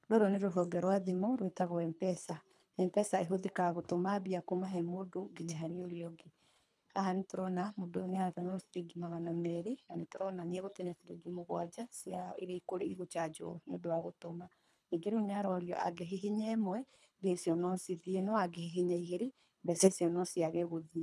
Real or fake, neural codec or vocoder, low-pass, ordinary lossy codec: fake; codec, 24 kHz, 3 kbps, HILCodec; none; none